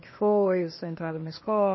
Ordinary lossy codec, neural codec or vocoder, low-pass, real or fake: MP3, 24 kbps; codec, 16 kHz, 0.8 kbps, ZipCodec; 7.2 kHz; fake